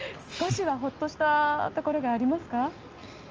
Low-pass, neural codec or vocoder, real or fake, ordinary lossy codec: 7.2 kHz; none; real; Opus, 24 kbps